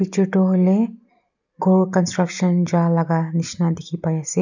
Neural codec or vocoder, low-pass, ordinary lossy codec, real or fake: none; 7.2 kHz; none; real